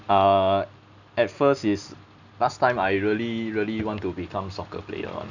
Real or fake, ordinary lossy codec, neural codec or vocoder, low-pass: real; none; none; 7.2 kHz